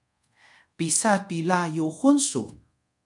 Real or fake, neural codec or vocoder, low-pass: fake; codec, 24 kHz, 0.5 kbps, DualCodec; 10.8 kHz